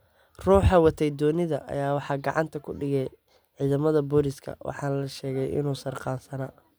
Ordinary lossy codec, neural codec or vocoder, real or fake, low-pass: none; none; real; none